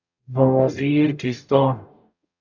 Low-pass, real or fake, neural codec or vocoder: 7.2 kHz; fake; codec, 44.1 kHz, 0.9 kbps, DAC